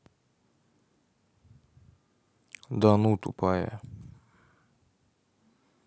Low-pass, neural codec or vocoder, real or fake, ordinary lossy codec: none; none; real; none